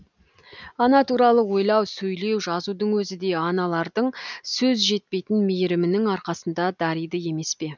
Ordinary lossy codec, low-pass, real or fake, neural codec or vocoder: none; 7.2 kHz; real; none